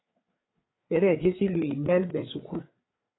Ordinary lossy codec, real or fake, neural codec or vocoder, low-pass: AAC, 16 kbps; fake; codec, 16 kHz, 4 kbps, FreqCodec, larger model; 7.2 kHz